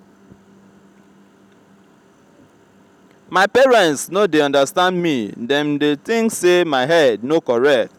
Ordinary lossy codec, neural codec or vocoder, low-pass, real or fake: none; none; 19.8 kHz; real